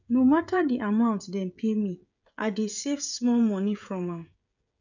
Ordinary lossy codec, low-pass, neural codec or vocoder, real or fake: none; 7.2 kHz; codec, 16 kHz, 16 kbps, FreqCodec, smaller model; fake